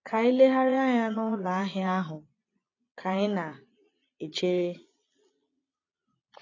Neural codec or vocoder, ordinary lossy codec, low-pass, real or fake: vocoder, 22.05 kHz, 80 mel bands, Vocos; AAC, 32 kbps; 7.2 kHz; fake